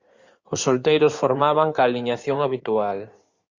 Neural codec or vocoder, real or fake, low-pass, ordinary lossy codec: codec, 16 kHz in and 24 kHz out, 2.2 kbps, FireRedTTS-2 codec; fake; 7.2 kHz; Opus, 64 kbps